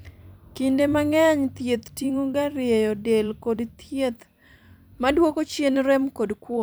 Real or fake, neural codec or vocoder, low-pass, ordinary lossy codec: fake; vocoder, 44.1 kHz, 128 mel bands every 256 samples, BigVGAN v2; none; none